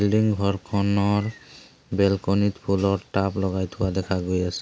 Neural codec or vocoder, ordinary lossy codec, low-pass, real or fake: none; none; none; real